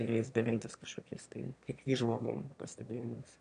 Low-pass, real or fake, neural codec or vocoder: 9.9 kHz; fake; autoencoder, 22.05 kHz, a latent of 192 numbers a frame, VITS, trained on one speaker